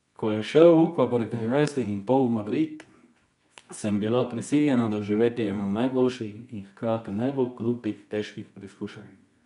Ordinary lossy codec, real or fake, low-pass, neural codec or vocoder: none; fake; 10.8 kHz; codec, 24 kHz, 0.9 kbps, WavTokenizer, medium music audio release